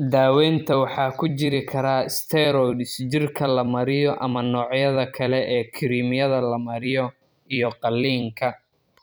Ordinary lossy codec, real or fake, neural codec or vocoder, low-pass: none; fake; vocoder, 44.1 kHz, 128 mel bands every 256 samples, BigVGAN v2; none